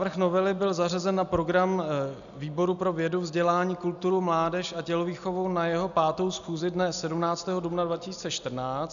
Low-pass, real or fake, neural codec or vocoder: 7.2 kHz; real; none